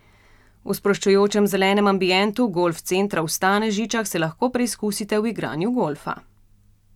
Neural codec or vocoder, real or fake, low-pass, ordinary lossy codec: none; real; 19.8 kHz; none